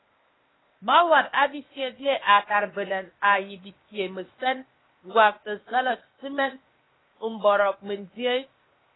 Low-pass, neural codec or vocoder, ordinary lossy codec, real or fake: 7.2 kHz; codec, 16 kHz, 0.8 kbps, ZipCodec; AAC, 16 kbps; fake